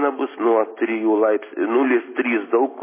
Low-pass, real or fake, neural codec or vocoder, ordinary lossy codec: 3.6 kHz; real; none; MP3, 16 kbps